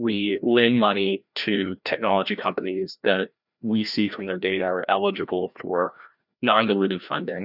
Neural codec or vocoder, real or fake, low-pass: codec, 16 kHz, 1 kbps, FreqCodec, larger model; fake; 5.4 kHz